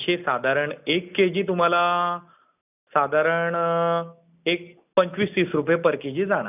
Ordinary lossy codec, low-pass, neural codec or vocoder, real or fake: none; 3.6 kHz; none; real